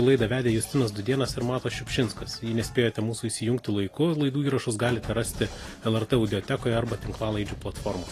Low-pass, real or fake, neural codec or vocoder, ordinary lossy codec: 14.4 kHz; real; none; AAC, 48 kbps